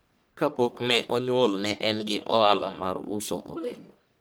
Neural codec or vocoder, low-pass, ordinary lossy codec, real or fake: codec, 44.1 kHz, 1.7 kbps, Pupu-Codec; none; none; fake